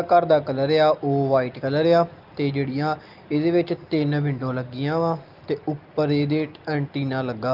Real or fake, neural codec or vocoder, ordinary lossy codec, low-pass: real; none; Opus, 32 kbps; 5.4 kHz